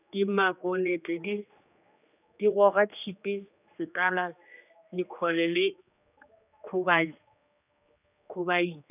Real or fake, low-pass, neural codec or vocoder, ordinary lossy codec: fake; 3.6 kHz; codec, 16 kHz, 2 kbps, X-Codec, HuBERT features, trained on general audio; none